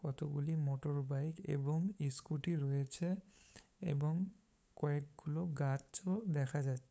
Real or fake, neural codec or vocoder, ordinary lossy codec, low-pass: fake; codec, 16 kHz, 8 kbps, FunCodec, trained on LibriTTS, 25 frames a second; none; none